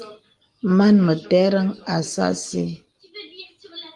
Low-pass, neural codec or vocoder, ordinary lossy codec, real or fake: 10.8 kHz; none; Opus, 24 kbps; real